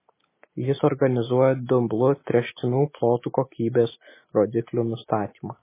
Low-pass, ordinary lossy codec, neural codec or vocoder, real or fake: 3.6 kHz; MP3, 16 kbps; none; real